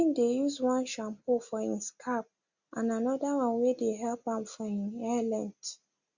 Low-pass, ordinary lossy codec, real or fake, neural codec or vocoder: 7.2 kHz; Opus, 64 kbps; real; none